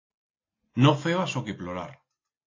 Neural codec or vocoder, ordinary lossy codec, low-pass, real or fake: none; AAC, 32 kbps; 7.2 kHz; real